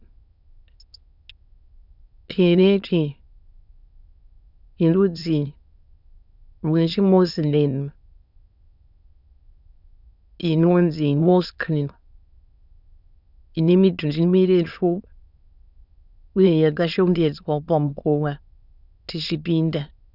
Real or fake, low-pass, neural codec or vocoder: fake; 5.4 kHz; autoencoder, 22.05 kHz, a latent of 192 numbers a frame, VITS, trained on many speakers